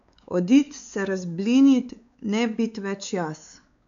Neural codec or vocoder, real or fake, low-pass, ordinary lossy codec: codec, 16 kHz, 4 kbps, X-Codec, WavLM features, trained on Multilingual LibriSpeech; fake; 7.2 kHz; none